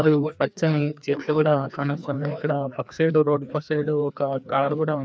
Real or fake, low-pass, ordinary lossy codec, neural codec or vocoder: fake; none; none; codec, 16 kHz, 2 kbps, FreqCodec, larger model